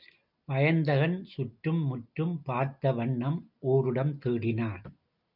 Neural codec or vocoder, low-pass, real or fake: none; 5.4 kHz; real